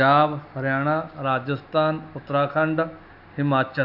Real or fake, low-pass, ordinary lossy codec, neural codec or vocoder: real; 5.4 kHz; none; none